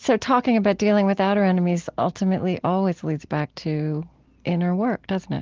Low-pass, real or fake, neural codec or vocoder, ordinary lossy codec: 7.2 kHz; real; none; Opus, 16 kbps